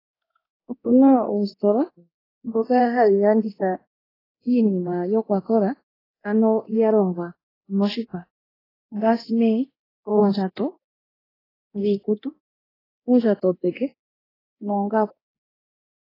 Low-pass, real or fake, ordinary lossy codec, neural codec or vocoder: 5.4 kHz; fake; AAC, 24 kbps; codec, 24 kHz, 0.9 kbps, DualCodec